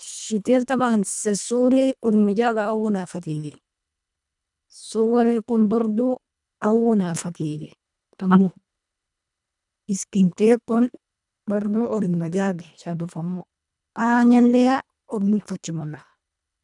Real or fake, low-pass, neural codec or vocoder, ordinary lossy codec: fake; 10.8 kHz; codec, 24 kHz, 1.5 kbps, HILCodec; none